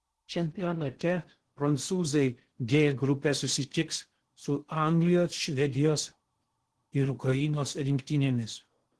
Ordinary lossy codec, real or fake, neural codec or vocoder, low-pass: Opus, 16 kbps; fake; codec, 16 kHz in and 24 kHz out, 0.6 kbps, FocalCodec, streaming, 2048 codes; 10.8 kHz